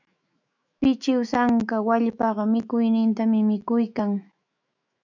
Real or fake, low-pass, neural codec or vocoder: fake; 7.2 kHz; autoencoder, 48 kHz, 128 numbers a frame, DAC-VAE, trained on Japanese speech